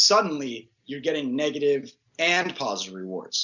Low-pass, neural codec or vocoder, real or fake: 7.2 kHz; none; real